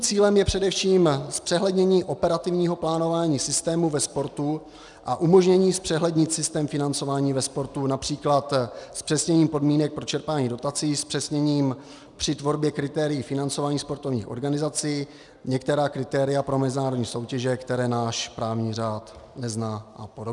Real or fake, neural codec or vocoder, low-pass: real; none; 10.8 kHz